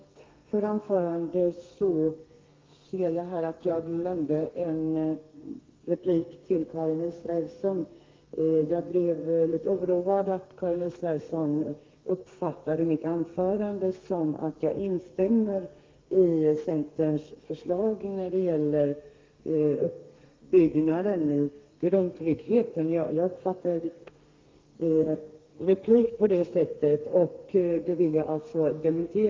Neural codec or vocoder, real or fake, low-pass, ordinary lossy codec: codec, 32 kHz, 1.9 kbps, SNAC; fake; 7.2 kHz; Opus, 32 kbps